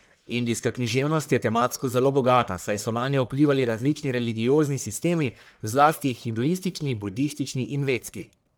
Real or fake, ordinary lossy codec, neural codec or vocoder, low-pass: fake; none; codec, 44.1 kHz, 1.7 kbps, Pupu-Codec; none